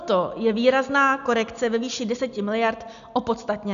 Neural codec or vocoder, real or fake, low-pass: none; real; 7.2 kHz